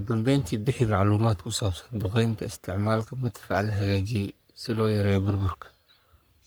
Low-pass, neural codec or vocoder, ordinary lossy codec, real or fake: none; codec, 44.1 kHz, 3.4 kbps, Pupu-Codec; none; fake